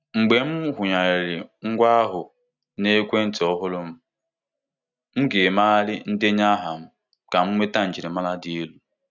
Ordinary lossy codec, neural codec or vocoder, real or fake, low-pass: none; none; real; 7.2 kHz